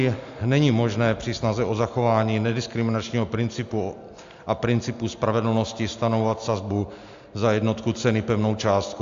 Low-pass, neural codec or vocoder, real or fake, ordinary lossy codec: 7.2 kHz; none; real; AAC, 64 kbps